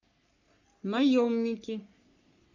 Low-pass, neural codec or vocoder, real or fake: 7.2 kHz; codec, 44.1 kHz, 3.4 kbps, Pupu-Codec; fake